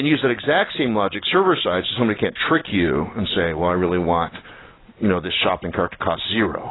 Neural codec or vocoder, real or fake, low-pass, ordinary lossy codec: none; real; 7.2 kHz; AAC, 16 kbps